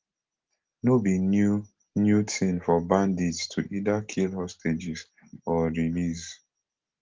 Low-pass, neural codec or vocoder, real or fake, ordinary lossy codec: 7.2 kHz; none; real; Opus, 16 kbps